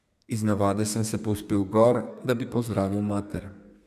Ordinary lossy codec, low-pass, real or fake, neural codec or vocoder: none; 14.4 kHz; fake; codec, 32 kHz, 1.9 kbps, SNAC